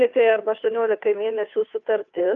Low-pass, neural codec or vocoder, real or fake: 7.2 kHz; codec, 16 kHz, 2 kbps, FunCodec, trained on Chinese and English, 25 frames a second; fake